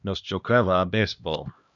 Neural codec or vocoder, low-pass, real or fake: codec, 16 kHz, 1 kbps, X-Codec, HuBERT features, trained on LibriSpeech; 7.2 kHz; fake